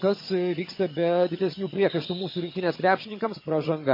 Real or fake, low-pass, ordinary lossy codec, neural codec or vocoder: fake; 5.4 kHz; MP3, 24 kbps; vocoder, 22.05 kHz, 80 mel bands, HiFi-GAN